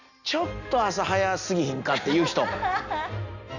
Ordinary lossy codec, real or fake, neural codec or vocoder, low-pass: none; real; none; 7.2 kHz